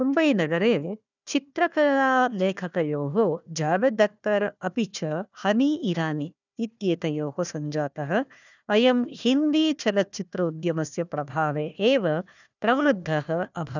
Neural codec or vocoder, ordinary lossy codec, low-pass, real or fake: codec, 16 kHz, 1 kbps, FunCodec, trained on Chinese and English, 50 frames a second; none; 7.2 kHz; fake